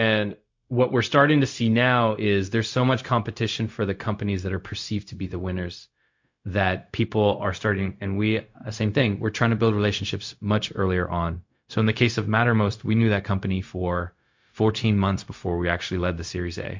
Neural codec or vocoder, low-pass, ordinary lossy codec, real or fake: codec, 16 kHz, 0.4 kbps, LongCat-Audio-Codec; 7.2 kHz; MP3, 48 kbps; fake